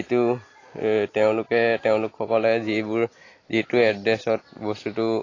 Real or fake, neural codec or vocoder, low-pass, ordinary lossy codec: real; none; 7.2 kHz; AAC, 32 kbps